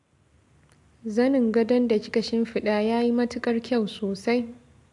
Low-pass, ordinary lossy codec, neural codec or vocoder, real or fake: 10.8 kHz; AAC, 64 kbps; none; real